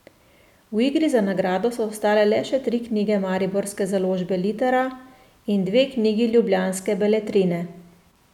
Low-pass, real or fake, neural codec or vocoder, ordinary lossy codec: 19.8 kHz; real; none; none